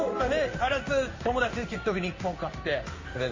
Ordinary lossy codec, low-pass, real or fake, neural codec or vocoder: MP3, 32 kbps; 7.2 kHz; fake; codec, 16 kHz in and 24 kHz out, 1 kbps, XY-Tokenizer